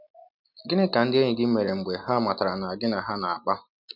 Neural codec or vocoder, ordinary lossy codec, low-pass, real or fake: none; none; 5.4 kHz; real